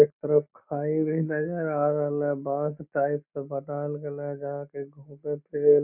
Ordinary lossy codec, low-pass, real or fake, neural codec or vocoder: none; 3.6 kHz; real; none